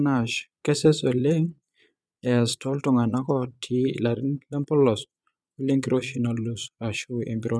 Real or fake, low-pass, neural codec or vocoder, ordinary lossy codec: fake; none; vocoder, 22.05 kHz, 80 mel bands, Vocos; none